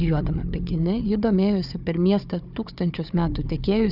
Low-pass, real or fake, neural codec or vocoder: 5.4 kHz; fake; codec, 16 kHz, 4 kbps, FunCodec, trained on Chinese and English, 50 frames a second